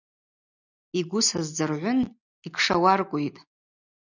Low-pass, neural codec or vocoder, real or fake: 7.2 kHz; none; real